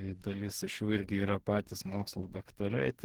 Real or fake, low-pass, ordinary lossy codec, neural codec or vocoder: fake; 19.8 kHz; Opus, 16 kbps; codec, 44.1 kHz, 2.6 kbps, DAC